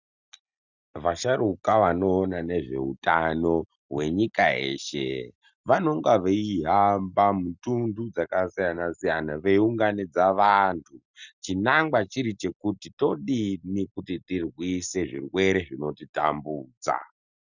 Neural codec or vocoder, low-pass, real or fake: none; 7.2 kHz; real